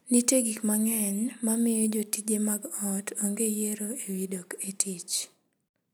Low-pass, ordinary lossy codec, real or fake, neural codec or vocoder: none; none; real; none